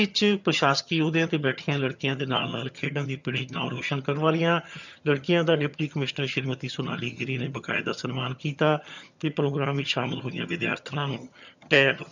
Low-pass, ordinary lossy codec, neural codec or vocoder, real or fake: 7.2 kHz; none; vocoder, 22.05 kHz, 80 mel bands, HiFi-GAN; fake